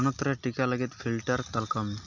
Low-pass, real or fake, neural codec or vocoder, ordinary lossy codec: 7.2 kHz; real; none; none